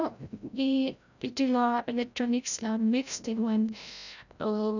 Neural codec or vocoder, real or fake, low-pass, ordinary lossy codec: codec, 16 kHz, 0.5 kbps, FreqCodec, larger model; fake; 7.2 kHz; none